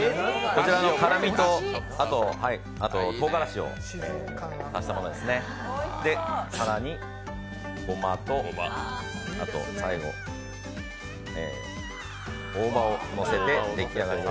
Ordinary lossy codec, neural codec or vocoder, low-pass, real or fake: none; none; none; real